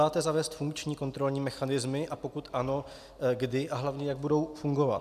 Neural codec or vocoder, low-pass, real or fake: none; 14.4 kHz; real